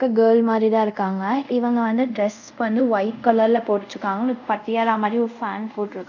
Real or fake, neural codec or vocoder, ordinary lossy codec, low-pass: fake; codec, 24 kHz, 0.5 kbps, DualCodec; none; 7.2 kHz